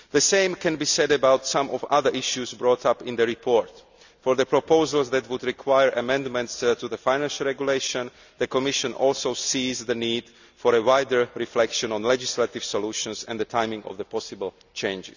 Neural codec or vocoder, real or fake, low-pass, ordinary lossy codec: none; real; 7.2 kHz; none